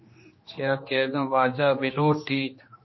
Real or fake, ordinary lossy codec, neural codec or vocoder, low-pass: fake; MP3, 24 kbps; codec, 16 kHz, 2 kbps, X-Codec, HuBERT features, trained on general audio; 7.2 kHz